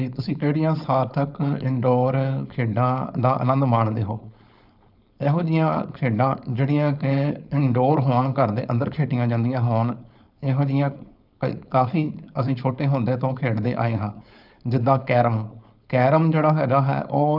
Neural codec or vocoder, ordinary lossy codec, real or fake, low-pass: codec, 16 kHz, 4.8 kbps, FACodec; none; fake; 5.4 kHz